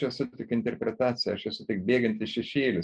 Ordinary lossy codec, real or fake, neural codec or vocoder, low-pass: MP3, 96 kbps; real; none; 9.9 kHz